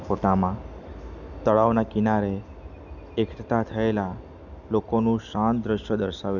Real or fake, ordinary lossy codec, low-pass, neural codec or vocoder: real; none; 7.2 kHz; none